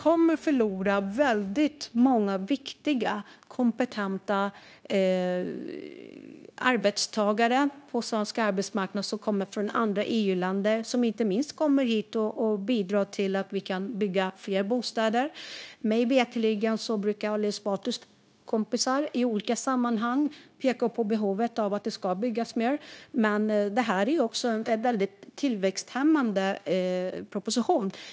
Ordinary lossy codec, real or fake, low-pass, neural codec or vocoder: none; fake; none; codec, 16 kHz, 0.9 kbps, LongCat-Audio-Codec